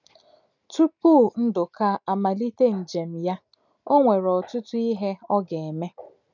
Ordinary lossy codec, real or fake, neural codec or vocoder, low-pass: none; real; none; 7.2 kHz